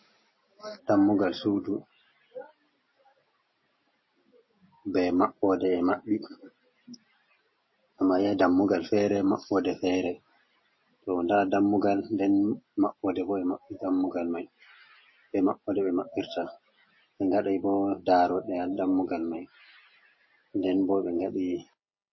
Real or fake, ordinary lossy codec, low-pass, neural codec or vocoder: real; MP3, 24 kbps; 7.2 kHz; none